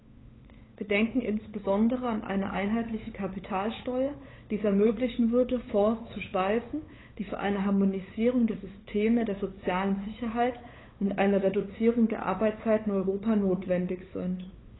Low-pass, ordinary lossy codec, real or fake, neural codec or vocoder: 7.2 kHz; AAC, 16 kbps; fake; codec, 16 kHz, 8 kbps, FunCodec, trained on LibriTTS, 25 frames a second